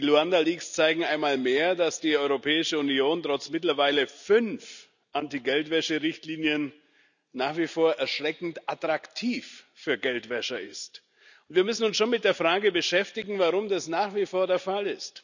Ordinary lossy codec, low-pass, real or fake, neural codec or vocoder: none; 7.2 kHz; real; none